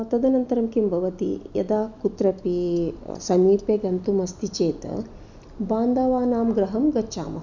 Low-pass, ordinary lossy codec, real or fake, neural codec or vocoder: 7.2 kHz; none; real; none